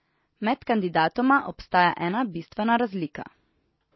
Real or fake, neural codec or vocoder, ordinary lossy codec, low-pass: real; none; MP3, 24 kbps; 7.2 kHz